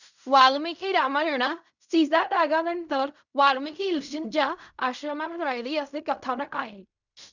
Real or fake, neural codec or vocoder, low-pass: fake; codec, 16 kHz in and 24 kHz out, 0.4 kbps, LongCat-Audio-Codec, fine tuned four codebook decoder; 7.2 kHz